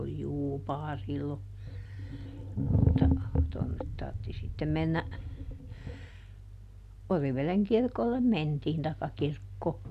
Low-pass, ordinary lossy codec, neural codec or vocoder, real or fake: 14.4 kHz; none; none; real